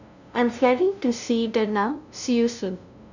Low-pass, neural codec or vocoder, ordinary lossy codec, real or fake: 7.2 kHz; codec, 16 kHz, 0.5 kbps, FunCodec, trained on LibriTTS, 25 frames a second; none; fake